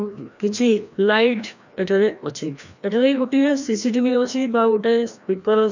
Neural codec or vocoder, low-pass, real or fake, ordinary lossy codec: codec, 16 kHz, 1 kbps, FreqCodec, larger model; 7.2 kHz; fake; none